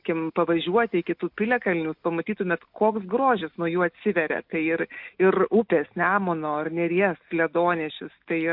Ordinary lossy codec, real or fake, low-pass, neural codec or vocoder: MP3, 48 kbps; real; 5.4 kHz; none